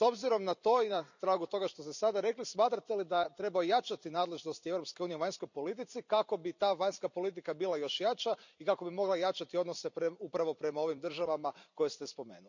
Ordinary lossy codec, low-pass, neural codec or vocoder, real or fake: none; 7.2 kHz; vocoder, 44.1 kHz, 128 mel bands every 256 samples, BigVGAN v2; fake